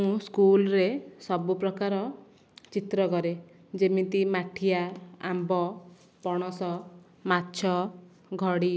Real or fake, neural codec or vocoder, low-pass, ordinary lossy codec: real; none; none; none